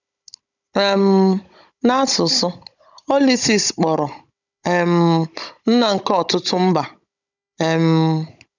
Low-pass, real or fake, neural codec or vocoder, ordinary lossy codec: 7.2 kHz; fake; codec, 16 kHz, 16 kbps, FunCodec, trained on Chinese and English, 50 frames a second; none